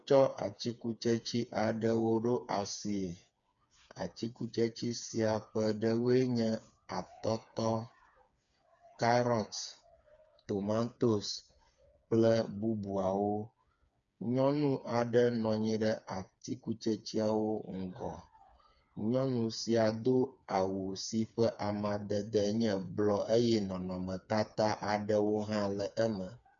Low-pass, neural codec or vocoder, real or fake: 7.2 kHz; codec, 16 kHz, 4 kbps, FreqCodec, smaller model; fake